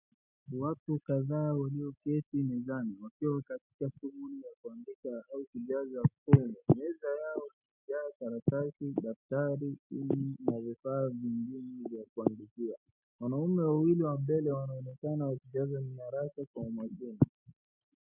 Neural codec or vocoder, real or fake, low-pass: none; real; 3.6 kHz